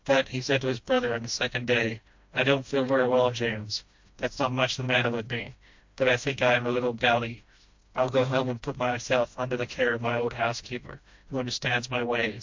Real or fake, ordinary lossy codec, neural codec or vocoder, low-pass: fake; MP3, 48 kbps; codec, 16 kHz, 1 kbps, FreqCodec, smaller model; 7.2 kHz